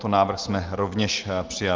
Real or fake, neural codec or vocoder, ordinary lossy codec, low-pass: real; none; Opus, 32 kbps; 7.2 kHz